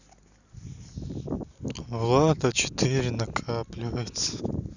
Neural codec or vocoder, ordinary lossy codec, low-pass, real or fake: none; none; 7.2 kHz; real